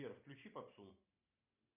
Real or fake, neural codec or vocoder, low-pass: real; none; 3.6 kHz